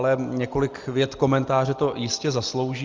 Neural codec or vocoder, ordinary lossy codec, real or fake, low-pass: none; Opus, 32 kbps; real; 7.2 kHz